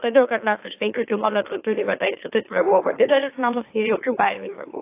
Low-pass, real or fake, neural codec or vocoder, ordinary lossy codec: 3.6 kHz; fake; autoencoder, 44.1 kHz, a latent of 192 numbers a frame, MeloTTS; AAC, 24 kbps